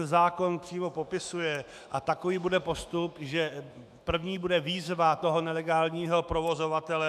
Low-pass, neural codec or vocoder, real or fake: 14.4 kHz; autoencoder, 48 kHz, 128 numbers a frame, DAC-VAE, trained on Japanese speech; fake